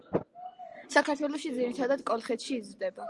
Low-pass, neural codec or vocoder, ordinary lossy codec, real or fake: 10.8 kHz; none; Opus, 24 kbps; real